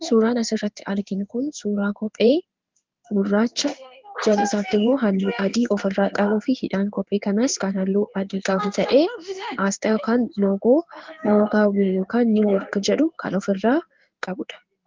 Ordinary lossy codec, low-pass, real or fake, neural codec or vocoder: Opus, 32 kbps; 7.2 kHz; fake; codec, 16 kHz in and 24 kHz out, 1 kbps, XY-Tokenizer